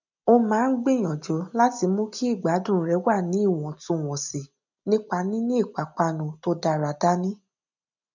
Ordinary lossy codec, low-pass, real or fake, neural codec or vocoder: none; 7.2 kHz; real; none